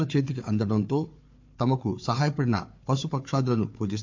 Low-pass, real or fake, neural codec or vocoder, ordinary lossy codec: 7.2 kHz; fake; codec, 16 kHz, 4 kbps, FunCodec, trained on Chinese and English, 50 frames a second; MP3, 48 kbps